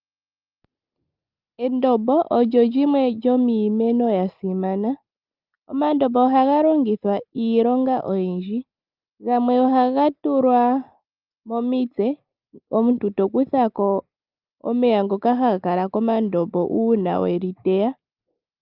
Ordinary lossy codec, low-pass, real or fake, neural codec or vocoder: Opus, 24 kbps; 5.4 kHz; real; none